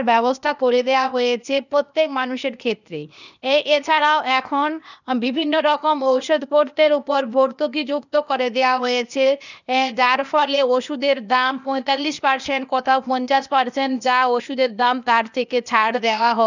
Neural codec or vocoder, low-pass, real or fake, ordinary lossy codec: codec, 16 kHz, 0.8 kbps, ZipCodec; 7.2 kHz; fake; none